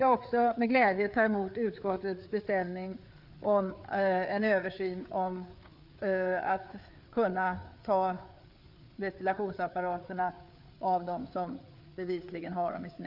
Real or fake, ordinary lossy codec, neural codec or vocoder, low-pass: fake; none; codec, 16 kHz, 4 kbps, FreqCodec, larger model; 5.4 kHz